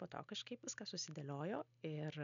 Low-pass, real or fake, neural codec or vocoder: 7.2 kHz; real; none